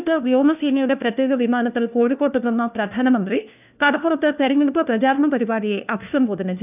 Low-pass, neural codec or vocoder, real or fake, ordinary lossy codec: 3.6 kHz; codec, 16 kHz, 1 kbps, FunCodec, trained on LibriTTS, 50 frames a second; fake; none